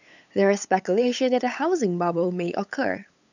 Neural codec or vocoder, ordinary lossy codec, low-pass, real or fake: codec, 16 kHz, 8 kbps, FunCodec, trained on LibriTTS, 25 frames a second; none; 7.2 kHz; fake